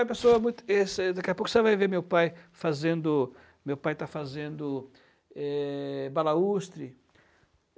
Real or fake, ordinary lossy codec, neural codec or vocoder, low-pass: real; none; none; none